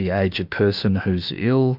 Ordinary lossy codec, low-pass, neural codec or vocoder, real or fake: Opus, 64 kbps; 5.4 kHz; autoencoder, 48 kHz, 32 numbers a frame, DAC-VAE, trained on Japanese speech; fake